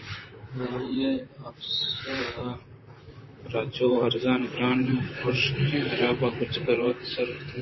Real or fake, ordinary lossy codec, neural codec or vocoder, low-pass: fake; MP3, 24 kbps; vocoder, 44.1 kHz, 128 mel bands, Pupu-Vocoder; 7.2 kHz